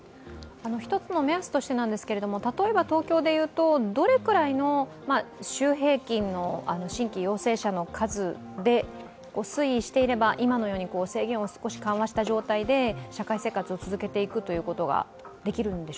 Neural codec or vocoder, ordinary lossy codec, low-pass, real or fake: none; none; none; real